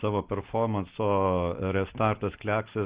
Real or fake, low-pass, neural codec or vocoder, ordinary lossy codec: real; 3.6 kHz; none; Opus, 16 kbps